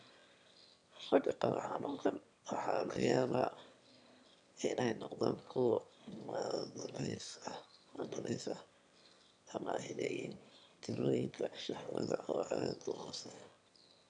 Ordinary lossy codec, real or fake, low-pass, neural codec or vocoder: none; fake; 9.9 kHz; autoencoder, 22.05 kHz, a latent of 192 numbers a frame, VITS, trained on one speaker